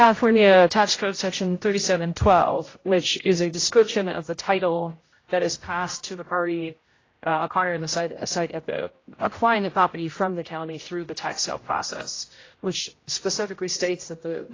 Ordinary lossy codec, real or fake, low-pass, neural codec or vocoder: AAC, 32 kbps; fake; 7.2 kHz; codec, 16 kHz, 0.5 kbps, X-Codec, HuBERT features, trained on general audio